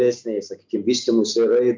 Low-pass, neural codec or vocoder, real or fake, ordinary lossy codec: 7.2 kHz; none; real; MP3, 64 kbps